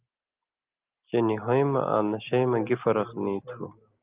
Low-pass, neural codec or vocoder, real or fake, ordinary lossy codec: 3.6 kHz; none; real; Opus, 32 kbps